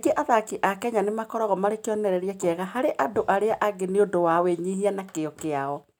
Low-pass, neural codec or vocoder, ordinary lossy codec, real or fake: none; none; none; real